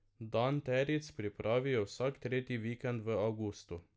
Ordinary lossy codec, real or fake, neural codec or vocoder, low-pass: none; real; none; none